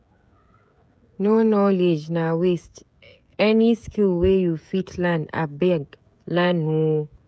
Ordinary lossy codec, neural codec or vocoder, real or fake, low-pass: none; codec, 16 kHz, 16 kbps, FreqCodec, smaller model; fake; none